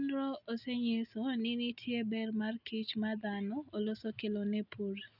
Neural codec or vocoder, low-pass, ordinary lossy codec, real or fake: none; 5.4 kHz; none; real